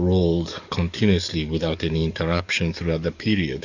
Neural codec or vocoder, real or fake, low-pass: codec, 44.1 kHz, 7.8 kbps, DAC; fake; 7.2 kHz